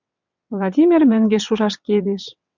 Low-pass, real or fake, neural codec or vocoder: 7.2 kHz; fake; vocoder, 22.05 kHz, 80 mel bands, WaveNeXt